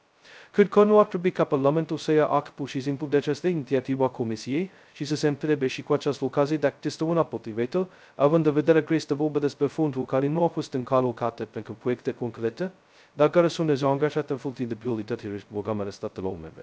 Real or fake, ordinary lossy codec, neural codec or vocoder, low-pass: fake; none; codec, 16 kHz, 0.2 kbps, FocalCodec; none